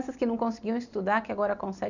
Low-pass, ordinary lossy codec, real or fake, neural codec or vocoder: 7.2 kHz; none; real; none